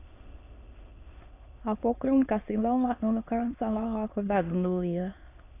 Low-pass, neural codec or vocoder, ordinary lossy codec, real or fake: 3.6 kHz; autoencoder, 22.05 kHz, a latent of 192 numbers a frame, VITS, trained on many speakers; AAC, 24 kbps; fake